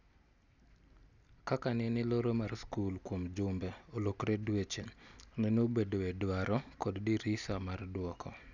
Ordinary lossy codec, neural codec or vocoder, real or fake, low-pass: none; none; real; 7.2 kHz